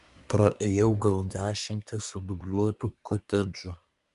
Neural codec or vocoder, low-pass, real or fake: codec, 24 kHz, 1 kbps, SNAC; 10.8 kHz; fake